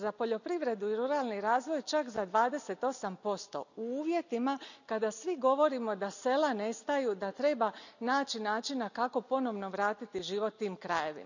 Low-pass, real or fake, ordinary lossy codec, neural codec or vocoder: 7.2 kHz; real; none; none